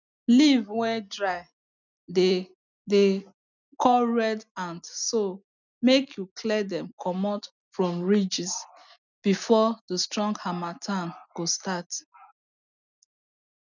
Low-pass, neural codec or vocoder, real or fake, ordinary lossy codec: 7.2 kHz; none; real; none